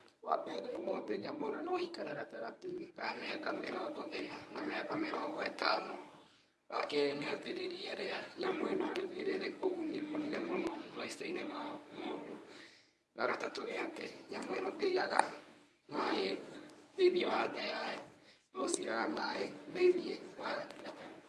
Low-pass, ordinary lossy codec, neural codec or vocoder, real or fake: none; none; codec, 24 kHz, 0.9 kbps, WavTokenizer, medium speech release version 1; fake